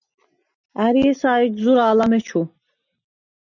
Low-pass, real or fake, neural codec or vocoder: 7.2 kHz; real; none